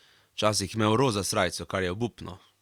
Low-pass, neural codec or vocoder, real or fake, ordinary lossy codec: 19.8 kHz; none; real; Opus, 64 kbps